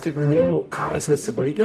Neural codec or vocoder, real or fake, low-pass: codec, 44.1 kHz, 0.9 kbps, DAC; fake; 14.4 kHz